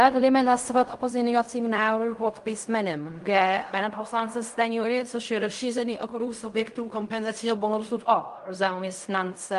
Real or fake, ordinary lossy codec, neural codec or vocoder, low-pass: fake; Opus, 32 kbps; codec, 16 kHz in and 24 kHz out, 0.4 kbps, LongCat-Audio-Codec, fine tuned four codebook decoder; 10.8 kHz